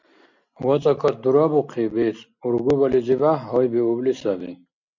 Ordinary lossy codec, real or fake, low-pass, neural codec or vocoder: MP3, 64 kbps; real; 7.2 kHz; none